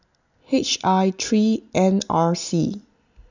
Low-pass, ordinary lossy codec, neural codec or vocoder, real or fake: 7.2 kHz; none; none; real